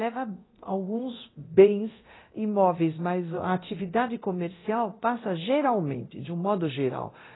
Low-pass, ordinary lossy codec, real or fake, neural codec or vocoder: 7.2 kHz; AAC, 16 kbps; fake; codec, 24 kHz, 0.9 kbps, DualCodec